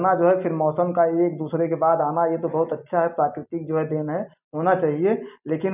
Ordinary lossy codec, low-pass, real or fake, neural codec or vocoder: none; 3.6 kHz; real; none